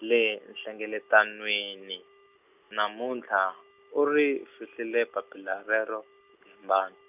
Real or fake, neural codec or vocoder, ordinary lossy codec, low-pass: real; none; none; 3.6 kHz